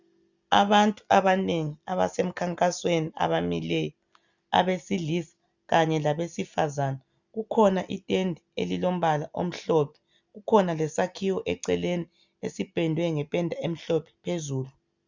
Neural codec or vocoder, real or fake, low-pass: none; real; 7.2 kHz